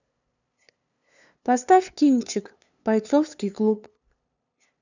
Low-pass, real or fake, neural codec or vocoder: 7.2 kHz; fake; codec, 16 kHz, 2 kbps, FunCodec, trained on LibriTTS, 25 frames a second